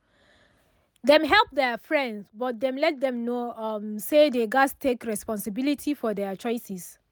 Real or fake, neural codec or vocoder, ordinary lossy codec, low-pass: real; none; none; none